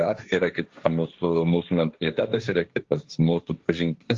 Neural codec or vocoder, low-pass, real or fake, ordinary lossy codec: codec, 16 kHz, 1.1 kbps, Voila-Tokenizer; 7.2 kHz; fake; Opus, 32 kbps